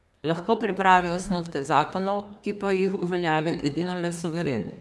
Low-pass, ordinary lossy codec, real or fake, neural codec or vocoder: none; none; fake; codec, 24 kHz, 1 kbps, SNAC